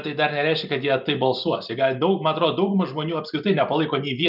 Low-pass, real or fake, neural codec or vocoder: 5.4 kHz; real; none